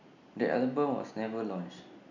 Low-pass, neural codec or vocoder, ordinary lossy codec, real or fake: 7.2 kHz; none; none; real